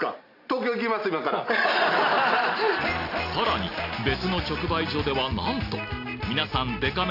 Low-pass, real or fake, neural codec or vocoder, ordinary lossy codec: 5.4 kHz; real; none; AAC, 48 kbps